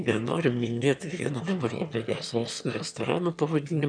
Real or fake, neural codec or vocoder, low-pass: fake; autoencoder, 22.05 kHz, a latent of 192 numbers a frame, VITS, trained on one speaker; 9.9 kHz